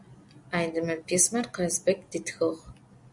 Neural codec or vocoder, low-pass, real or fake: none; 10.8 kHz; real